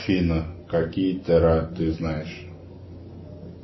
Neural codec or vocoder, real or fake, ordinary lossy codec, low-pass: none; real; MP3, 24 kbps; 7.2 kHz